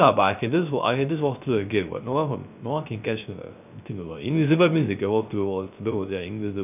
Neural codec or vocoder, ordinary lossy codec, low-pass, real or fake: codec, 16 kHz, 0.3 kbps, FocalCodec; none; 3.6 kHz; fake